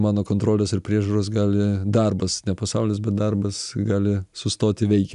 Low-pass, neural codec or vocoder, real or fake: 10.8 kHz; none; real